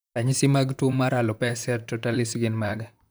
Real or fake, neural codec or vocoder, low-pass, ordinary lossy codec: fake; vocoder, 44.1 kHz, 128 mel bands, Pupu-Vocoder; none; none